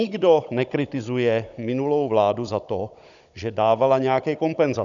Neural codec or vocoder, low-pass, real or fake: codec, 16 kHz, 16 kbps, FunCodec, trained on Chinese and English, 50 frames a second; 7.2 kHz; fake